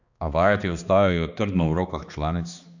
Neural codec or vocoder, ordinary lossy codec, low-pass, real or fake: codec, 16 kHz, 2 kbps, X-Codec, HuBERT features, trained on balanced general audio; none; 7.2 kHz; fake